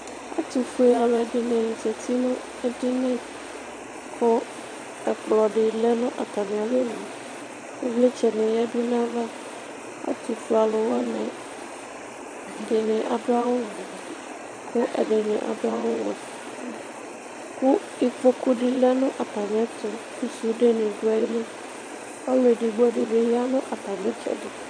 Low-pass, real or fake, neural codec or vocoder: 9.9 kHz; fake; vocoder, 22.05 kHz, 80 mel bands, Vocos